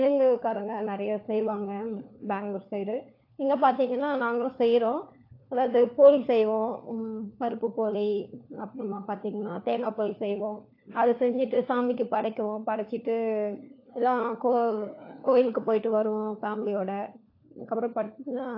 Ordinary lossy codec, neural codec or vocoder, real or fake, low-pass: AAC, 32 kbps; codec, 16 kHz, 4 kbps, FunCodec, trained on LibriTTS, 50 frames a second; fake; 5.4 kHz